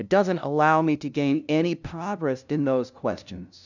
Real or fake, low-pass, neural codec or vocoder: fake; 7.2 kHz; codec, 16 kHz, 0.5 kbps, FunCodec, trained on LibriTTS, 25 frames a second